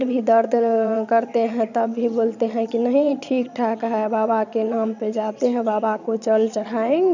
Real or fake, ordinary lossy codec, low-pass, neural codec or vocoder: fake; none; 7.2 kHz; vocoder, 22.05 kHz, 80 mel bands, Vocos